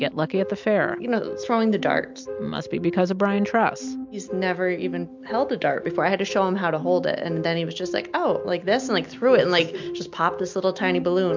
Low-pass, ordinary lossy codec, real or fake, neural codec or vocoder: 7.2 kHz; MP3, 64 kbps; real; none